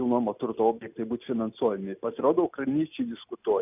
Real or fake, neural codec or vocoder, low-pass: real; none; 3.6 kHz